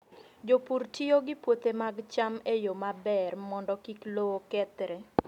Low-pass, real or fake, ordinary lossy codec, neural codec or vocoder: 19.8 kHz; real; none; none